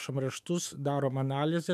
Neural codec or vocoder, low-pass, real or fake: autoencoder, 48 kHz, 128 numbers a frame, DAC-VAE, trained on Japanese speech; 14.4 kHz; fake